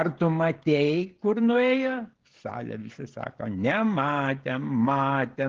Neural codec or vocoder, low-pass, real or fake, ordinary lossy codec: codec, 16 kHz, 16 kbps, FreqCodec, smaller model; 7.2 kHz; fake; Opus, 16 kbps